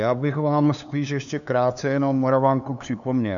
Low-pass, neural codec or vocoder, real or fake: 7.2 kHz; codec, 16 kHz, 2 kbps, FunCodec, trained on LibriTTS, 25 frames a second; fake